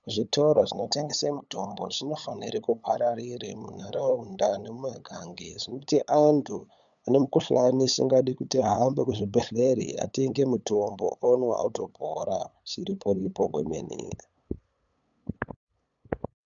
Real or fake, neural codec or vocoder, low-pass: fake; codec, 16 kHz, 8 kbps, FunCodec, trained on LibriTTS, 25 frames a second; 7.2 kHz